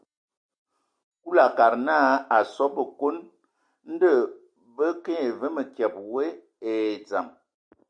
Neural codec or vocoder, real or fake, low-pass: none; real; 9.9 kHz